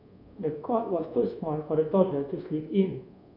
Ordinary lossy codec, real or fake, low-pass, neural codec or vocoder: none; fake; 5.4 kHz; codec, 24 kHz, 1.2 kbps, DualCodec